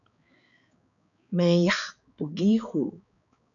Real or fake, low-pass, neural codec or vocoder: fake; 7.2 kHz; codec, 16 kHz, 4 kbps, X-Codec, HuBERT features, trained on balanced general audio